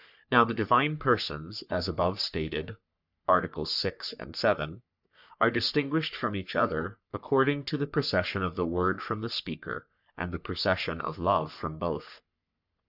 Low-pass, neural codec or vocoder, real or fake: 5.4 kHz; codec, 44.1 kHz, 3.4 kbps, Pupu-Codec; fake